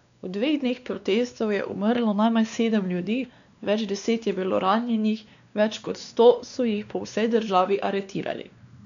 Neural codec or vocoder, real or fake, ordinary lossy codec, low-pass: codec, 16 kHz, 2 kbps, X-Codec, WavLM features, trained on Multilingual LibriSpeech; fake; none; 7.2 kHz